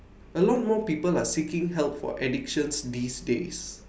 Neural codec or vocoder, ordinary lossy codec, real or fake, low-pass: none; none; real; none